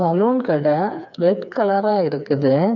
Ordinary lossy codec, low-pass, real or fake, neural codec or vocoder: none; 7.2 kHz; fake; codec, 16 kHz, 4 kbps, FreqCodec, smaller model